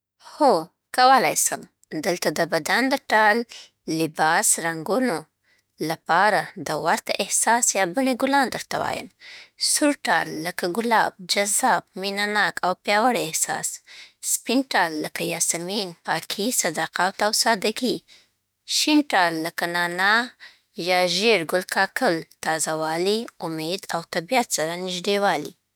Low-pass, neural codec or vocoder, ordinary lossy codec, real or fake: none; autoencoder, 48 kHz, 32 numbers a frame, DAC-VAE, trained on Japanese speech; none; fake